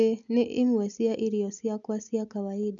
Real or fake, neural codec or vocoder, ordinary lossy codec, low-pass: real; none; none; 7.2 kHz